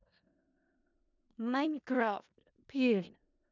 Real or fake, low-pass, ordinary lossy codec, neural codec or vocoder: fake; 7.2 kHz; none; codec, 16 kHz in and 24 kHz out, 0.4 kbps, LongCat-Audio-Codec, four codebook decoder